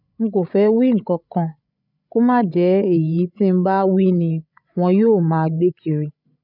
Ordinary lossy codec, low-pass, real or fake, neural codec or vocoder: none; 5.4 kHz; fake; codec, 16 kHz, 16 kbps, FreqCodec, larger model